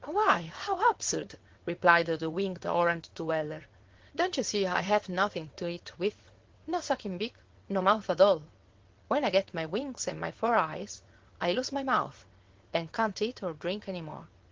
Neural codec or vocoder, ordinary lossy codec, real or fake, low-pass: vocoder, 22.05 kHz, 80 mel bands, WaveNeXt; Opus, 16 kbps; fake; 7.2 kHz